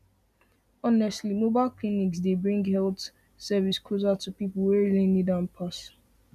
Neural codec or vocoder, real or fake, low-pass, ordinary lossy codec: none; real; 14.4 kHz; none